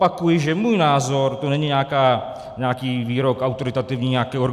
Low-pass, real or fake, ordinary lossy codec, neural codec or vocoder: 14.4 kHz; real; AAC, 96 kbps; none